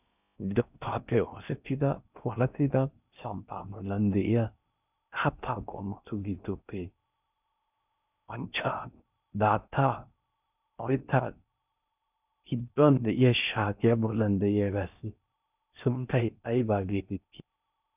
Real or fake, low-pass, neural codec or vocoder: fake; 3.6 kHz; codec, 16 kHz in and 24 kHz out, 0.6 kbps, FocalCodec, streaming, 4096 codes